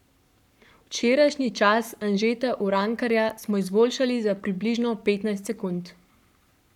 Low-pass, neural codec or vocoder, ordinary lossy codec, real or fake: 19.8 kHz; codec, 44.1 kHz, 7.8 kbps, Pupu-Codec; none; fake